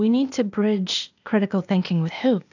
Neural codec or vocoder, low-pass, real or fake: codec, 16 kHz, 0.8 kbps, ZipCodec; 7.2 kHz; fake